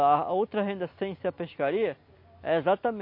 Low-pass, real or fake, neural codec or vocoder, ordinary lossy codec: 5.4 kHz; real; none; MP3, 32 kbps